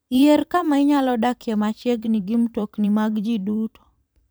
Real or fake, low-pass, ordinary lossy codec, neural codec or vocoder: fake; none; none; vocoder, 44.1 kHz, 128 mel bands every 512 samples, BigVGAN v2